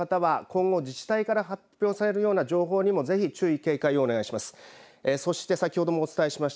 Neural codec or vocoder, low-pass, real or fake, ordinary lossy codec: none; none; real; none